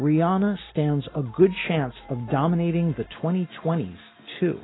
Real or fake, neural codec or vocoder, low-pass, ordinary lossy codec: real; none; 7.2 kHz; AAC, 16 kbps